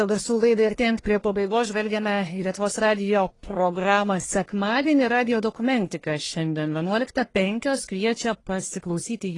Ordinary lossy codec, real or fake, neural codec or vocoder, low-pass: AAC, 32 kbps; fake; codec, 44.1 kHz, 1.7 kbps, Pupu-Codec; 10.8 kHz